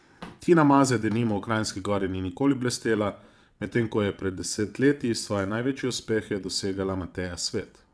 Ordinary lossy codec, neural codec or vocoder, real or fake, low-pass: none; vocoder, 22.05 kHz, 80 mel bands, WaveNeXt; fake; none